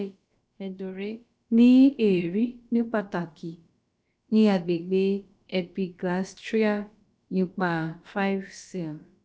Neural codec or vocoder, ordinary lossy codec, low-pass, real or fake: codec, 16 kHz, about 1 kbps, DyCAST, with the encoder's durations; none; none; fake